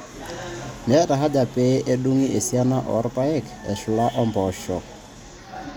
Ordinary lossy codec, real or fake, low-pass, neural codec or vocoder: none; real; none; none